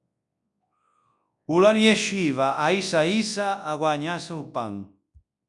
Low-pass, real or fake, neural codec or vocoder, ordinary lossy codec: 10.8 kHz; fake; codec, 24 kHz, 0.9 kbps, WavTokenizer, large speech release; MP3, 64 kbps